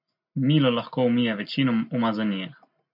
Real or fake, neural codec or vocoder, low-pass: real; none; 5.4 kHz